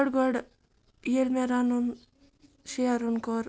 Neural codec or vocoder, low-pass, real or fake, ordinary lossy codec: none; none; real; none